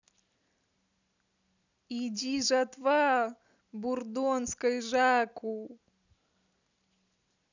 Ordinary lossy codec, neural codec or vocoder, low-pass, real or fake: none; none; 7.2 kHz; real